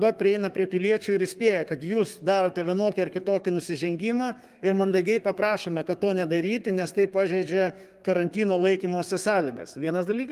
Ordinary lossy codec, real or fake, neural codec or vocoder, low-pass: Opus, 32 kbps; fake; codec, 32 kHz, 1.9 kbps, SNAC; 14.4 kHz